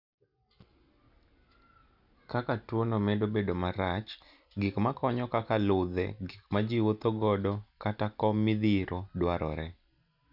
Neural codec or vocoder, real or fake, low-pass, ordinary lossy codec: none; real; 5.4 kHz; none